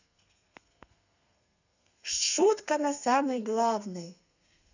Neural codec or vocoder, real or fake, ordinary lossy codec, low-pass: codec, 32 kHz, 1.9 kbps, SNAC; fake; none; 7.2 kHz